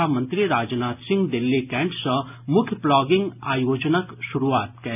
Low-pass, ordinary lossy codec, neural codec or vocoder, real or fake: 3.6 kHz; none; none; real